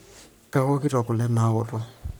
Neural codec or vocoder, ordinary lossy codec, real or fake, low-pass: codec, 44.1 kHz, 3.4 kbps, Pupu-Codec; none; fake; none